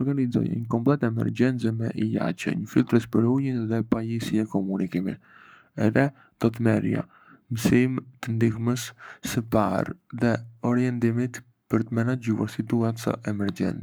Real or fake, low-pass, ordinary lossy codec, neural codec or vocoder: fake; none; none; codec, 44.1 kHz, 7.8 kbps, Pupu-Codec